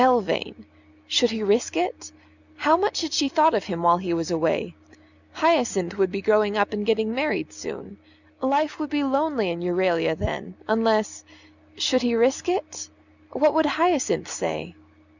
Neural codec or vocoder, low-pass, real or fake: none; 7.2 kHz; real